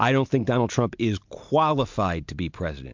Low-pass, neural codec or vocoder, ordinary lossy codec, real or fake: 7.2 kHz; none; MP3, 64 kbps; real